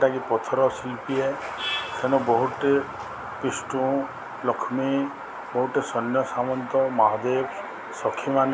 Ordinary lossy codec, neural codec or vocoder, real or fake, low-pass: none; none; real; none